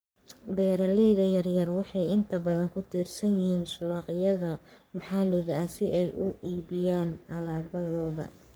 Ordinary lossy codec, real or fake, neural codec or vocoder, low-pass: none; fake; codec, 44.1 kHz, 3.4 kbps, Pupu-Codec; none